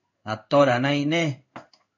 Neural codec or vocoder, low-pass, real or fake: codec, 16 kHz in and 24 kHz out, 1 kbps, XY-Tokenizer; 7.2 kHz; fake